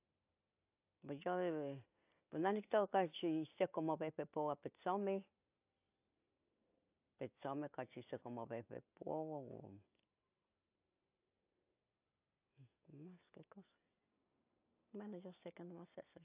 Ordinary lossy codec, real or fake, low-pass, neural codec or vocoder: AAC, 32 kbps; real; 3.6 kHz; none